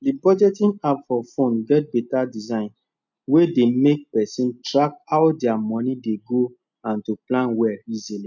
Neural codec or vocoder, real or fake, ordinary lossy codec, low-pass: none; real; none; 7.2 kHz